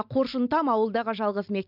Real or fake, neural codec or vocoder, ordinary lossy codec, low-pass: real; none; none; 5.4 kHz